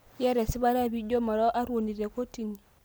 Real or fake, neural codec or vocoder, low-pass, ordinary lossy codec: real; none; none; none